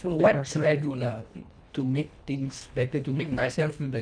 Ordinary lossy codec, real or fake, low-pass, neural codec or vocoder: none; fake; 9.9 kHz; codec, 24 kHz, 1.5 kbps, HILCodec